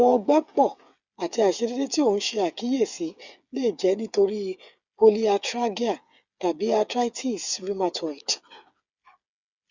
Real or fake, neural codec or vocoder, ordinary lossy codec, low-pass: fake; codec, 16 kHz, 8 kbps, FreqCodec, smaller model; Opus, 64 kbps; 7.2 kHz